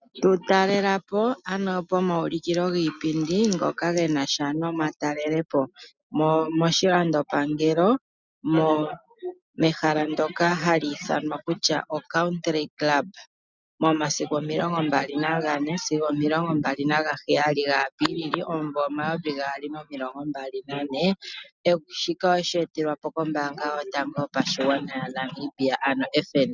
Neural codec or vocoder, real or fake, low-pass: none; real; 7.2 kHz